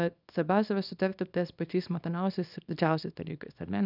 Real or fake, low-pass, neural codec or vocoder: fake; 5.4 kHz; codec, 24 kHz, 0.9 kbps, WavTokenizer, small release